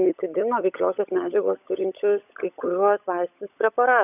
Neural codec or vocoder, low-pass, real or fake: codec, 16 kHz, 16 kbps, FunCodec, trained on LibriTTS, 50 frames a second; 3.6 kHz; fake